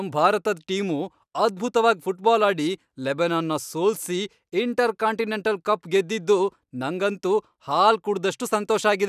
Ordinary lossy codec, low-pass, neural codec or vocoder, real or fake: none; 14.4 kHz; none; real